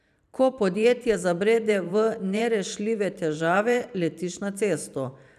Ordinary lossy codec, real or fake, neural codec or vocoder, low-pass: none; fake; vocoder, 44.1 kHz, 128 mel bands every 512 samples, BigVGAN v2; 14.4 kHz